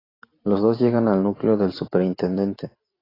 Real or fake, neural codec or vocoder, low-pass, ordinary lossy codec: fake; vocoder, 44.1 kHz, 128 mel bands every 512 samples, BigVGAN v2; 5.4 kHz; AAC, 24 kbps